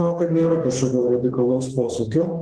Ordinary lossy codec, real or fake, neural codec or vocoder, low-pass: Opus, 16 kbps; fake; autoencoder, 48 kHz, 32 numbers a frame, DAC-VAE, trained on Japanese speech; 10.8 kHz